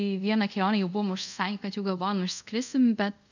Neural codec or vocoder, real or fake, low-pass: codec, 24 kHz, 0.5 kbps, DualCodec; fake; 7.2 kHz